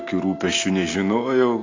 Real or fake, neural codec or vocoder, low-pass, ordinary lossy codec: real; none; 7.2 kHz; AAC, 32 kbps